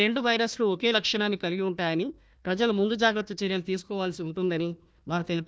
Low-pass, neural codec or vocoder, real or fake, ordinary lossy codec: none; codec, 16 kHz, 1 kbps, FunCodec, trained on Chinese and English, 50 frames a second; fake; none